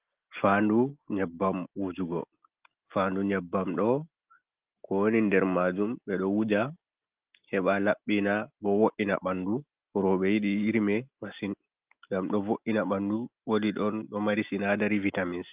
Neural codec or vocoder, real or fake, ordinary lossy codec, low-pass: none; real; Opus, 32 kbps; 3.6 kHz